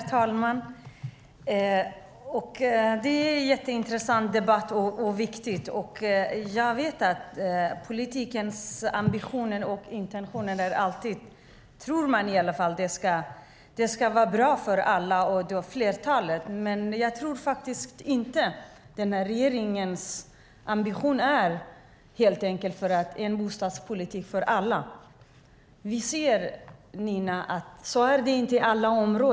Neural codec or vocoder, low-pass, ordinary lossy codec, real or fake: none; none; none; real